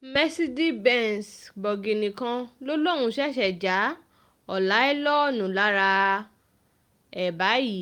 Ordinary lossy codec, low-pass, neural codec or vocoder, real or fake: Opus, 32 kbps; 19.8 kHz; none; real